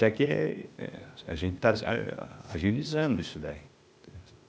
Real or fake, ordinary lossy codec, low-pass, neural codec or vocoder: fake; none; none; codec, 16 kHz, 0.8 kbps, ZipCodec